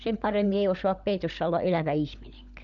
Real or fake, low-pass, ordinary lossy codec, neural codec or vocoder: fake; 7.2 kHz; none; codec, 16 kHz, 16 kbps, FunCodec, trained on LibriTTS, 50 frames a second